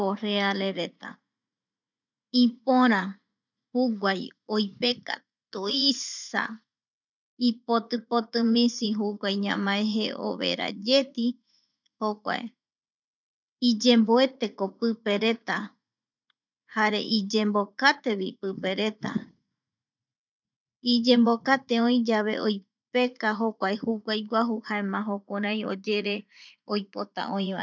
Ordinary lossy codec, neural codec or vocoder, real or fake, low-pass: none; vocoder, 44.1 kHz, 80 mel bands, Vocos; fake; 7.2 kHz